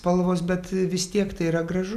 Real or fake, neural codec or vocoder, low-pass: real; none; 14.4 kHz